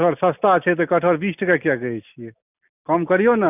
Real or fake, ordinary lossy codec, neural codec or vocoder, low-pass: real; none; none; 3.6 kHz